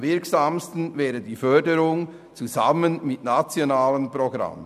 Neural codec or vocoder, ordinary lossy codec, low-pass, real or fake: none; none; 14.4 kHz; real